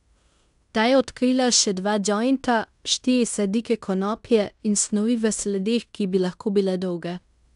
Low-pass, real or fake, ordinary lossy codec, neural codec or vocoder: 10.8 kHz; fake; none; codec, 16 kHz in and 24 kHz out, 0.9 kbps, LongCat-Audio-Codec, fine tuned four codebook decoder